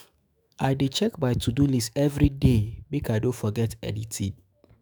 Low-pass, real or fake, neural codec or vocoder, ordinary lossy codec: none; fake; autoencoder, 48 kHz, 128 numbers a frame, DAC-VAE, trained on Japanese speech; none